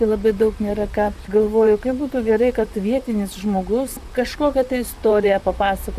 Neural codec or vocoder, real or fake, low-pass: vocoder, 44.1 kHz, 128 mel bands, Pupu-Vocoder; fake; 14.4 kHz